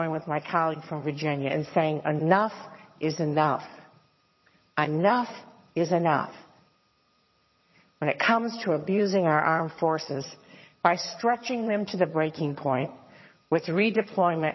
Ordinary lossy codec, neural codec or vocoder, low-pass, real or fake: MP3, 24 kbps; vocoder, 22.05 kHz, 80 mel bands, HiFi-GAN; 7.2 kHz; fake